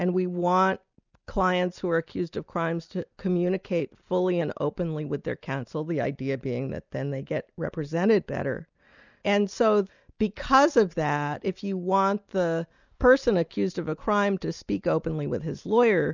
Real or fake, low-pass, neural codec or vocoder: real; 7.2 kHz; none